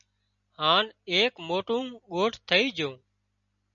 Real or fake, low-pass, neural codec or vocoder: real; 7.2 kHz; none